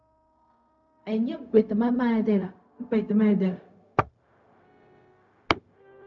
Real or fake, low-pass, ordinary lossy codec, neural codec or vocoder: fake; 7.2 kHz; MP3, 64 kbps; codec, 16 kHz, 0.4 kbps, LongCat-Audio-Codec